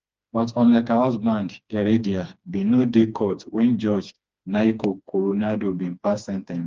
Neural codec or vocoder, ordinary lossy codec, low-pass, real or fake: codec, 16 kHz, 2 kbps, FreqCodec, smaller model; Opus, 24 kbps; 7.2 kHz; fake